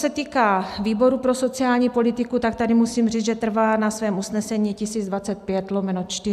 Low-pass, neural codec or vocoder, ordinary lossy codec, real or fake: 14.4 kHz; none; AAC, 96 kbps; real